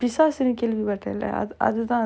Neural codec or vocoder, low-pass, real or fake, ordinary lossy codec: none; none; real; none